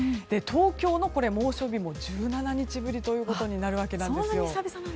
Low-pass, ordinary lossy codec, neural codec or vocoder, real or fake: none; none; none; real